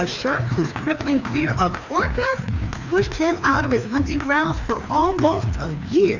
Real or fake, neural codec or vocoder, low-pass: fake; codec, 16 kHz, 2 kbps, FreqCodec, larger model; 7.2 kHz